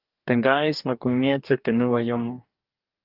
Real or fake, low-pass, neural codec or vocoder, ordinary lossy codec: fake; 5.4 kHz; codec, 44.1 kHz, 2.6 kbps, DAC; Opus, 24 kbps